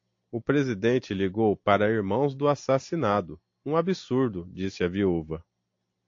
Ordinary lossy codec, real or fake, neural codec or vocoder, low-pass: AAC, 48 kbps; real; none; 7.2 kHz